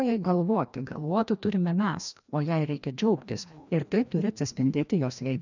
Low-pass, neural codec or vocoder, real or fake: 7.2 kHz; codec, 16 kHz, 1 kbps, FreqCodec, larger model; fake